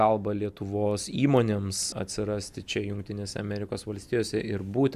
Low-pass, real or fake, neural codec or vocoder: 14.4 kHz; real; none